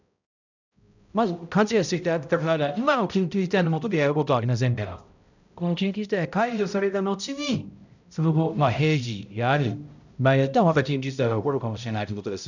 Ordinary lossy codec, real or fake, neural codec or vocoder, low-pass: none; fake; codec, 16 kHz, 0.5 kbps, X-Codec, HuBERT features, trained on balanced general audio; 7.2 kHz